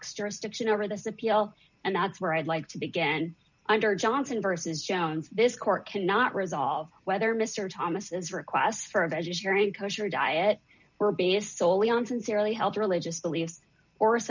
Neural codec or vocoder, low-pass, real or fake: none; 7.2 kHz; real